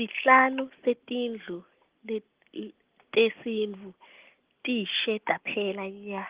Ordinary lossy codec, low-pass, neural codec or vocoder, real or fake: Opus, 16 kbps; 3.6 kHz; none; real